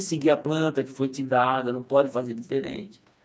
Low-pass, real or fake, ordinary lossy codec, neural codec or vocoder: none; fake; none; codec, 16 kHz, 2 kbps, FreqCodec, smaller model